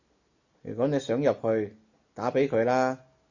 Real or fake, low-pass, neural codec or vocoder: real; 7.2 kHz; none